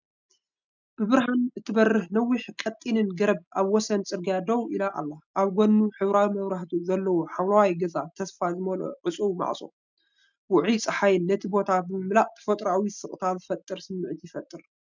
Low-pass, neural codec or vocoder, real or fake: 7.2 kHz; none; real